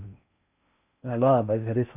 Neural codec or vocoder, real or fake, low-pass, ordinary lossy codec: codec, 16 kHz in and 24 kHz out, 0.6 kbps, FocalCodec, streaming, 4096 codes; fake; 3.6 kHz; none